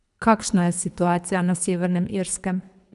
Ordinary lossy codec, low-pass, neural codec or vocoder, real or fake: none; 10.8 kHz; codec, 24 kHz, 3 kbps, HILCodec; fake